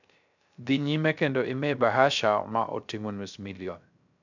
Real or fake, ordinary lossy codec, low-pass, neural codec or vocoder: fake; none; 7.2 kHz; codec, 16 kHz, 0.3 kbps, FocalCodec